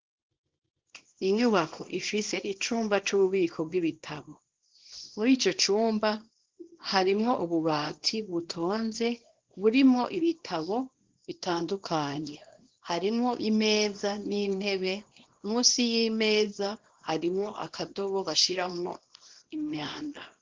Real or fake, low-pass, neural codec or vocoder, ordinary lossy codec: fake; 7.2 kHz; codec, 24 kHz, 0.9 kbps, WavTokenizer, small release; Opus, 16 kbps